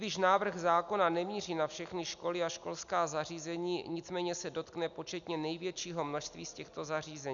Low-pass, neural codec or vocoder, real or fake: 7.2 kHz; none; real